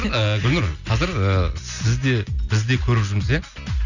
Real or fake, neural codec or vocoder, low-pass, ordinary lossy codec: real; none; 7.2 kHz; none